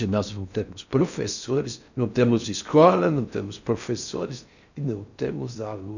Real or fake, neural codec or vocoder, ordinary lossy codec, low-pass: fake; codec, 16 kHz in and 24 kHz out, 0.6 kbps, FocalCodec, streaming, 4096 codes; none; 7.2 kHz